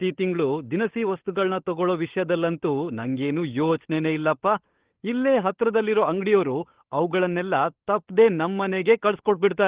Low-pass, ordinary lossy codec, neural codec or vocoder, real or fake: 3.6 kHz; Opus, 16 kbps; codec, 16 kHz, 16 kbps, FunCodec, trained on LibriTTS, 50 frames a second; fake